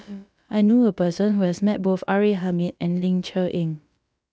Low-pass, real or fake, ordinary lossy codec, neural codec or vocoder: none; fake; none; codec, 16 kHz, about 1 kbps, DyCAST, with the encoder's durations